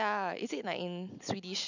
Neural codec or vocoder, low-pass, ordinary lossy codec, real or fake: none; 7.2 kHz; none; real